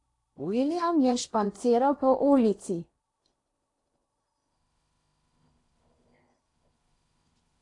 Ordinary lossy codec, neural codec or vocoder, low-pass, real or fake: AAC, 48 kbps; codec, 16 kHz in and 24 kHz out, 0.8 kbps, FocalCodec, streaming, 65536 codes; 10.8 kHz; fake